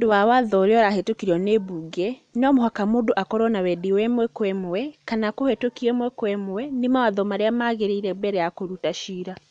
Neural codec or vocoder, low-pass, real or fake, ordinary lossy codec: none; 9.9 kHz; real; none